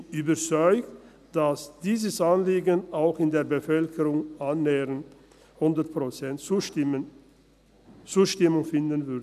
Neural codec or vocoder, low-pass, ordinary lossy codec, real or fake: none; 14.4 kHz; none; real